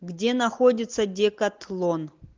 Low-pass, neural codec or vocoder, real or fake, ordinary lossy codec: 7.2 kHz; none; real; Opus, 32 kbps